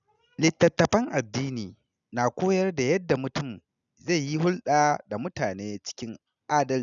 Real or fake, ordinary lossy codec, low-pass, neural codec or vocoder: real; none; 7.2 kHz; none